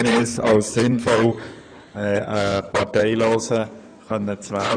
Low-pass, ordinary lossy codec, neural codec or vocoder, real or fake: 9.9 kHz; none; codec, 16 kHz in and 24 kHz out, 2.2 kbps, FireRedTTS-2 codec; fake